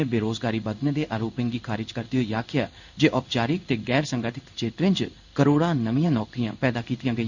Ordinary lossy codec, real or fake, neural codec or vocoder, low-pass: none; fake; codec, 16 kHz in and 24 kHz out, 1 kbps, XY-Tokenizer; 7.2 kHz